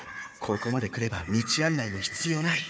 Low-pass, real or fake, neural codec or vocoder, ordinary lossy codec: none; fake; codec, 16 kHz, 4 kbps, FunCodec, trained on Chinese and English, 50 frames a second; none